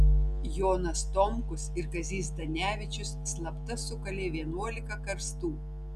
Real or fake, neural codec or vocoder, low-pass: real; none; 14.4 kHz